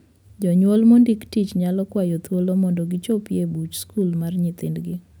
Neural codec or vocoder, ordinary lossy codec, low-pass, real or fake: none; none; none; real